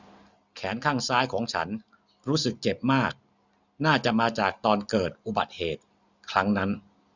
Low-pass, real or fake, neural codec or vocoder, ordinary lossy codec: 7.2 kHz; real; none; none